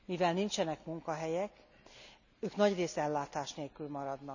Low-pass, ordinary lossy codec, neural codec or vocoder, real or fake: 7.2 kHz; none; none; real